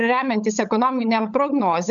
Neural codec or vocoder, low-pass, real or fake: codec, 16 kHz, 16 kbps, FunCodec, trained on LibriTTS, 50 frames a second; 7.2 kHz; fake